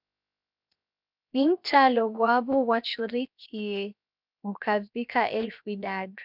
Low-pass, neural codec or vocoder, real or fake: 5.4 kHz; codec, 16 kHz, 0.7 kbps, FocalCodec; fake